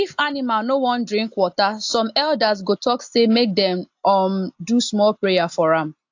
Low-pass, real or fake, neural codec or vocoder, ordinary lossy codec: 7.2 kHz; real; none; AAC, 48 kbps